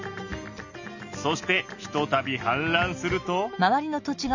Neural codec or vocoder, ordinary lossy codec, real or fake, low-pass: none; none; real; 7.2 kHz